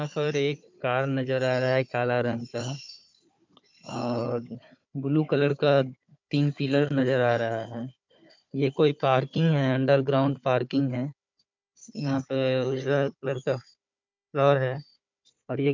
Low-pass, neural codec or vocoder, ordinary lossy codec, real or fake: 7.2 kHz; codec, 16 kHz, 4 kbps, FunCodec, trained on Chinese and English, 50 frames a second; MP3, 64 kbps; fake